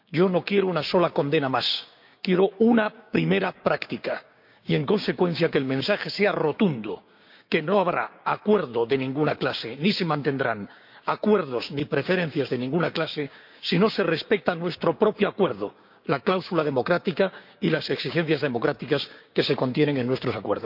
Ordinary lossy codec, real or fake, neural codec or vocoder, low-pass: none; fake; codec, 16 kHz, 6 kbps, DAC; 5.4 kHz